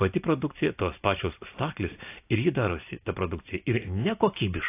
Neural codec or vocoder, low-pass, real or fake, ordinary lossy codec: none; 3.6 kHz; real; AAC, 24 kbps